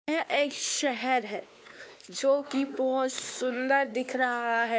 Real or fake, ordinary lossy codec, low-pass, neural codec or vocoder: fake; none; none; codec, 16 kHz, 2 kbps, X-Codec, WavLM features, trained on Multilingual LibriSpeech